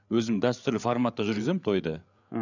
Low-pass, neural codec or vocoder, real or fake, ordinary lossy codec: 7.2 kHz; codec, 16 kHz, 8 kbps, FreqCodec, larger model; fake; none